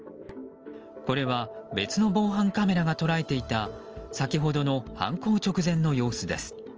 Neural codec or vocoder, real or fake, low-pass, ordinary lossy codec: none; real; 7.2 kHz; Opus, 24 kbps